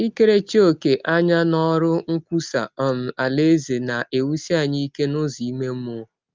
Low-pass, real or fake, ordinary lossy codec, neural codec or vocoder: 7.2 kHz; real; Opus, 24 kbps; none